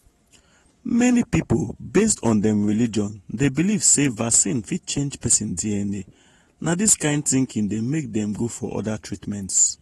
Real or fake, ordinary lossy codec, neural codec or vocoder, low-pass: fake; AAC, 32 kbps; vocoder, 48 kHz, 128 mel bands, Vocos; 19.8 kHz